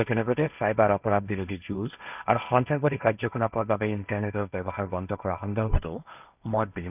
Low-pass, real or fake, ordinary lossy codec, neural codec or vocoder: 3.6 kHz; fake; none; codec, 16 kHz, 1.1 kbps, Voila-Tokenizer